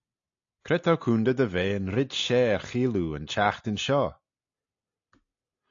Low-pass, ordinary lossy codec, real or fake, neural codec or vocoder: 7.2 kHz; AAC, 48 kbps; real; none